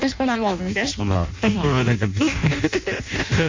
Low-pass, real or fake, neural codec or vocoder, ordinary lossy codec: 7.2 kHz; fake; codec, 16 kHz in and 24 kHz out, 0.6 kbps, FireRedTTS-2 codec; MP3, 64 kbps